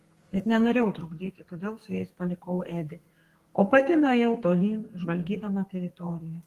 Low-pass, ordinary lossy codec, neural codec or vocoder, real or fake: 14.4 kHz; Opus, 24 kbps; codec, 32 kHz, 1.9 kbps, SNAC; fake